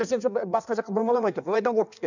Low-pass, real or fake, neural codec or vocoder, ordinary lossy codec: 7.2 kHz; fake; codec, 16 kHz in and 24 kHz out, 1.1 kbps, FireRedTTS-2 codec; none